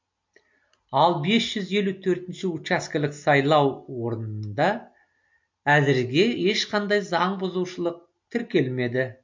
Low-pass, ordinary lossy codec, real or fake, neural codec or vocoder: 7.2 kHz; MP3, 48 kbps; real; none